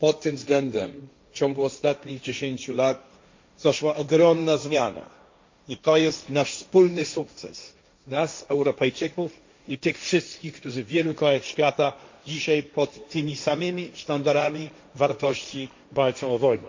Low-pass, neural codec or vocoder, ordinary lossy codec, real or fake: 7.2 kHz; codec, 16 kHz, 1.1 kbps, Voila-Tokenizer; MP3, 48 kbps; fake